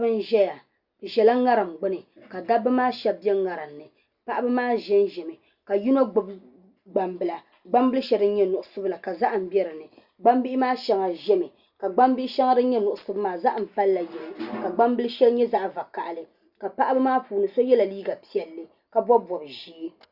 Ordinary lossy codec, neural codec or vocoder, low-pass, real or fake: Opus, 64 kbps; none; 5.4 kHz; real